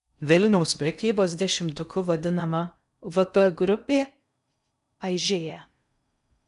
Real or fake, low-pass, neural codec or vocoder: fake; 10.8 kHz; codec, 16 kHz in and 24 kHz out, 0.6 kbps, FocalCodec, streaming, 4096 codes